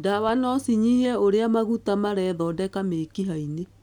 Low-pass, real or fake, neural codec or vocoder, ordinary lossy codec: 19.8 kHz; real; none; none